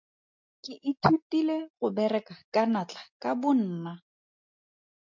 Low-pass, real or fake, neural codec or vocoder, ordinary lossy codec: 7.2 kHz; real; none; MP3, 48 kbps